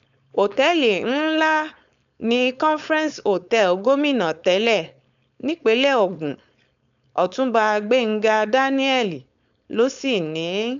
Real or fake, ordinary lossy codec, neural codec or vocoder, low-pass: fake; none; codec, 16 kHz, 4.8 kbps, FACodec; 7.2 kHz